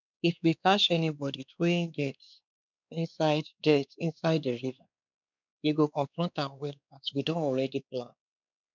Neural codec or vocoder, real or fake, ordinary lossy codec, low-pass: codec, 16 kHz, 2 kbps, X-Codec, WavLM features, trained on Multilingual LibriSpeech; fake; none; 7.2 kHz